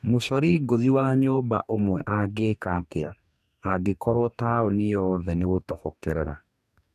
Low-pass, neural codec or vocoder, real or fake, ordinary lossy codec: 14.4 kHz; codec, 44.1 kHz, 2.6 kbps, DAC; fake; none